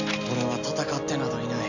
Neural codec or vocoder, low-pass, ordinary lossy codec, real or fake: none; 7.2 kHz; none; real